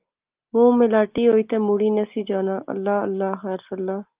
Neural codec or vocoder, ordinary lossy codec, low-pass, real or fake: none; Opus, 32 kbps; 3.6 kHz; real